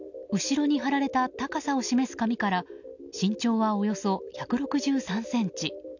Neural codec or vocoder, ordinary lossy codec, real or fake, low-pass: none; none; real; 7.2 kHz